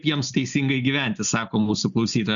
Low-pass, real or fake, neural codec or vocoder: 7.2 kHz; real; none